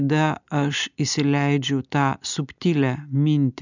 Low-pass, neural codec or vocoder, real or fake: 7.2 kHz; none; real